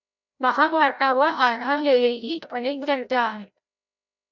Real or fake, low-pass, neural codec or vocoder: fake; 7.2 kHz; codec, 16 kHz, 0.5 kbps, FreqCodec, larger model